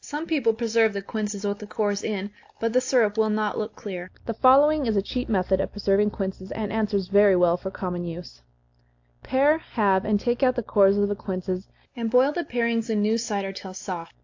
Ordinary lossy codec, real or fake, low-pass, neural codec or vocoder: AAC, 48 kbps; real; 7.2 kHz; none